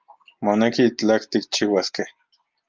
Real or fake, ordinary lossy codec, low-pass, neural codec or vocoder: real; Opus, 32 kbps; 7.2 kHz; none